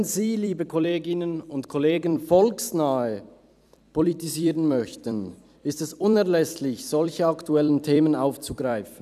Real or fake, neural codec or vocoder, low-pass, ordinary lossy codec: fake; vocoder, 44.1 kHz, 128 mel bands every 256 samples, BigVGAN v2; 14.4 kHz; none